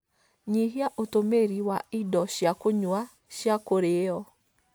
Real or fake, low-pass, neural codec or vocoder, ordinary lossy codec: real; none; none; none